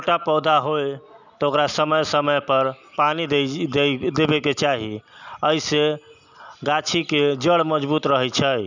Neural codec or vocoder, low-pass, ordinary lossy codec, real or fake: none; 7.2 kHz; none; real